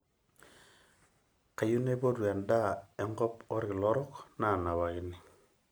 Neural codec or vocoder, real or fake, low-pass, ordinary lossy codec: none; real; none; none